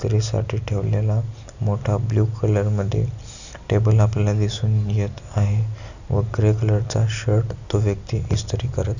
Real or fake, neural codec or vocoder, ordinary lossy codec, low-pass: real; none; none; 7.2 kHz